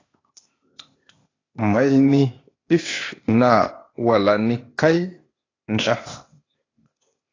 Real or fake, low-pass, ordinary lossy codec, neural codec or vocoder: fake; 7.2 kHz; AAC, 32 kbps; codec, 16 kHz, 0.8 kbps, ZipCodec